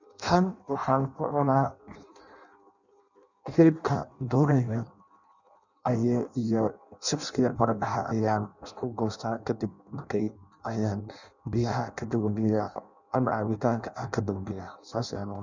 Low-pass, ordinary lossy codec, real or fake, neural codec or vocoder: 7.2 kHz; none; fake; codec, 16 kHz in and 24 kHz out, 0.6 kbps, FireRedTTS-2 codec